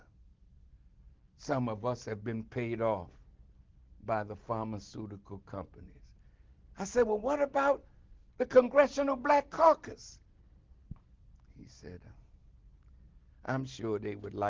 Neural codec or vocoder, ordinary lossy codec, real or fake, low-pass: none; Opus, 16 kbps; real; 7.2 kHz